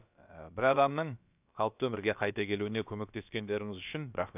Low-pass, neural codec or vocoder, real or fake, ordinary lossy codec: 3.6 kHz; codec, 16 kHz, about 1 kbps, DyCAST, with the encoder's durations; fake; none